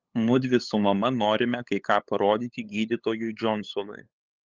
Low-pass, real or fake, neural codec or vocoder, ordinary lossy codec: 7.2 kHz; fake; codec, 16 kHz, 8 kbps, FunCodec, trained on LibriTTS, 25 frames a second; Opus, 32 kbps